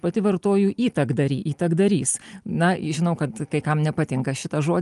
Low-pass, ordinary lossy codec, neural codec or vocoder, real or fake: 10.8 kHz; Opus, 32 kbps; none; real